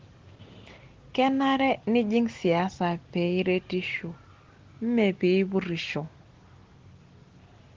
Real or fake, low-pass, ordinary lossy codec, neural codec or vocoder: real; 7.2 kHz; Opus, 16 kbps; none